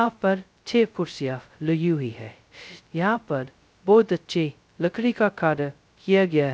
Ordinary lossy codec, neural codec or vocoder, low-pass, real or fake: none; codec, 16 kHz, 0.2 kbps, FocalCodec; none; fake